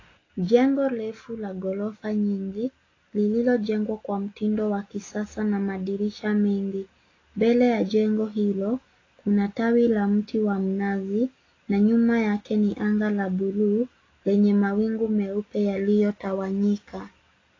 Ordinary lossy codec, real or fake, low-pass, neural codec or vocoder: AAC, 32 kbps; real; 7.2 kHz; none